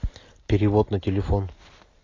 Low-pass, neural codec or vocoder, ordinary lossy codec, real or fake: 7.2 kHz; none; AAC, 32 kbps; real